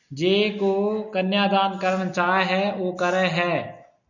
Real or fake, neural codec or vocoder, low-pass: real; none; 7.2 kHz